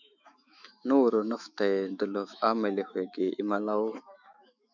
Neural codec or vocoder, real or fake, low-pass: autoencoder, 48 kHz, 128 numbers a frame, DAC-VAE, trained on Japanese speech; fake; 7.2 kHz